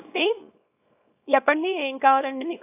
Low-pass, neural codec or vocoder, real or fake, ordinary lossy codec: 3.6 kHz; codec, 24 kHz, 0.9 kbps, WavTokenizer, small release; fake; AAC, 24 kbps